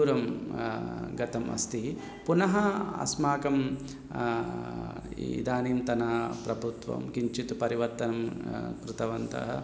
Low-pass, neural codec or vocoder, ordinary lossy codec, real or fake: none; none; none; real